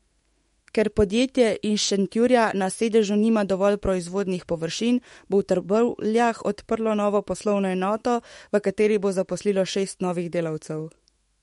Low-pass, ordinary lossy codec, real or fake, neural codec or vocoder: 19.8 kHz; MP3, 48 kbps; fake; autoencoder, 48 kHz, 128 numbers a frame, DAC-VAE, trained on Japanese speech